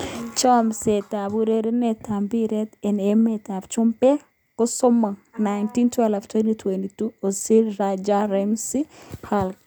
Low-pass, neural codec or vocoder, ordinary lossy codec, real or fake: none; none; none; real